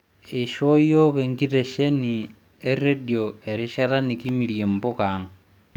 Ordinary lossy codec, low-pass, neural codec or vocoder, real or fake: none; 19.8 kHz; codec, 44.1 kHz, 7.8 kbps, DAC; fake